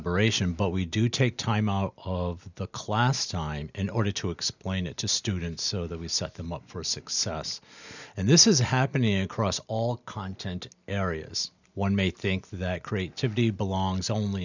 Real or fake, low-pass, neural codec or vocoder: real; 7.2 kHz; none